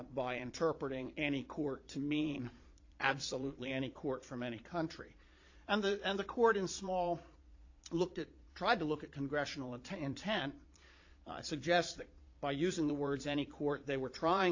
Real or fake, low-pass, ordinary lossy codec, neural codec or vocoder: fake; 7.2 kHz; AAC, 48 kbps; vocoder, 22.05 kHz, 80 mel bands, Vocos